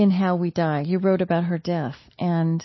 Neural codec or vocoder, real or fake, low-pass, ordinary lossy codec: none; real; 7.2 kHz; MP3, 24 kbps